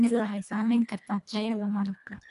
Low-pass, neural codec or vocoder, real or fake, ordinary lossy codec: 10.8 kHz; codec, 24 kHz, 1.5 kbps, HILCodec; fake; none